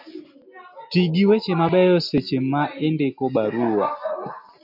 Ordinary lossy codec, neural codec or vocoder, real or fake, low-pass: MP3, 48 kbps; none; real; 5.4 kHz